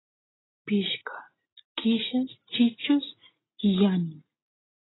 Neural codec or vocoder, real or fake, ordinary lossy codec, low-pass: none; real; AAC, 16 kbps; 7.2 kHz